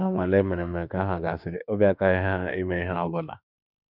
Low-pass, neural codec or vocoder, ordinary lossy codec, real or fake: 5.4 kHz; autoencoder, 48 kHz, 32 numbers a frame, DAC-VAE, trained on Japanese speech; none; fake